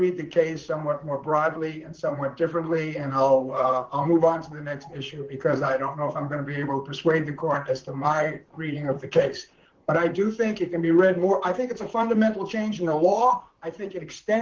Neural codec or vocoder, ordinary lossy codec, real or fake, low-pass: vocoder, 44.1 kHz, 128 mel bands, Pupu-Vocoder; Opus, 16 kbps; fake; 7.2 kHz